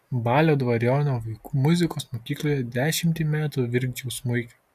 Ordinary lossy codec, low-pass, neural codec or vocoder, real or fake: MP3, 96 kbps; 14.4 kHz; none; real